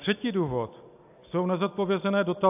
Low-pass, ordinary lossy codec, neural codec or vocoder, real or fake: 3.6 kHz; AAC, 32 kbps; none; real